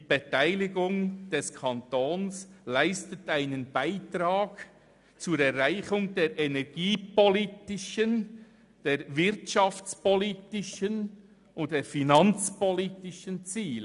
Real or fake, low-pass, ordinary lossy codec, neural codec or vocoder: real; 10.8 kHz; none; none